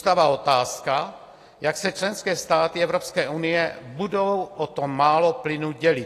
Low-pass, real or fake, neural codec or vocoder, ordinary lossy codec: 14.4 kHz; real; none; AAC, 48 kbps